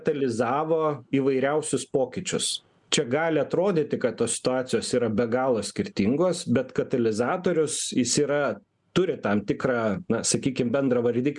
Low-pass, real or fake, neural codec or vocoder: 10.8 kHz; real; none